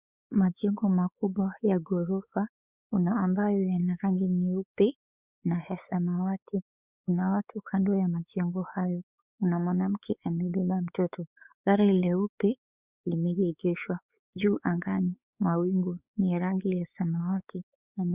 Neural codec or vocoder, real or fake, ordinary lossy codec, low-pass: codec, 16 kHz, 4 kbps, X-Codec, WavLM features, trained on Multilingual LibriSpeech; fake; Opus, 64 kbps; 3.6 kHz